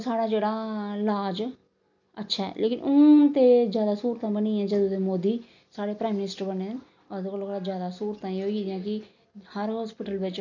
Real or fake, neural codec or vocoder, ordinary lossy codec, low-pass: real; none; none; 7.2 kHz